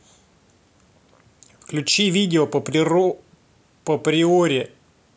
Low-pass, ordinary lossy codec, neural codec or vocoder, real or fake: none; none; none; real